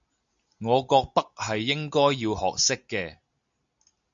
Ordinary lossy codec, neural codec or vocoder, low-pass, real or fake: MP3, 64 kbps; none; 7.2 kHz; real